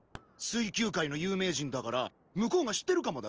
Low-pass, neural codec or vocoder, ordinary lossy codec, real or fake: 7.2 kHz; none; Opus, 24 kbps; real